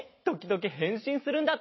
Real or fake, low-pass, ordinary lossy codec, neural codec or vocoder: real; 7.2 kHz; MP3, 24 kbps; none